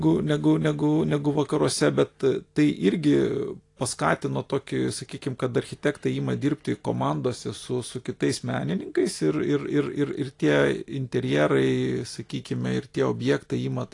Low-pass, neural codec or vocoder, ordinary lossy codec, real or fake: 10.8 kHz; none; AAC, 48 kbps; real